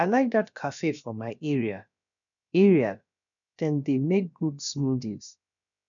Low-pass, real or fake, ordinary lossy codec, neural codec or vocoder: 7.2 kHz; fake; none; codec, 16 kHz, about 1 kbps, DyCAST, with the encoder's durations